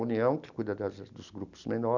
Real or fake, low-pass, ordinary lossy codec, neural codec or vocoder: real; 7.2 kHz; none; none